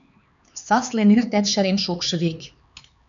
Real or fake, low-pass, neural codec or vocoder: fake; 7.2 kHz; codec, 16 kHz, 4 kbps, X-Codec, HuBERT features, trained on LibriSpeech